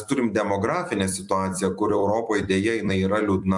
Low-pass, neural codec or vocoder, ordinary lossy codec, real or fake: 10.8 kHz; vocoder, 44.1 kHz, 128 mel bands every 256 samples, BigVGAN v2; MP3, 64 kbps; fake